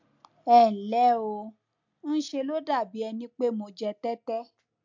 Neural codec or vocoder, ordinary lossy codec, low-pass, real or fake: none; MP3, 64 kbps; 7.2 kHz; real